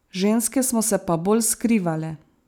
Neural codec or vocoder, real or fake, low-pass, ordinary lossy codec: none; real; none; none